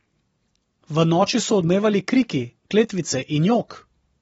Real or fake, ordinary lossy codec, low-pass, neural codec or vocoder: real; AAC, 24 kbps; 19.8 kHz; none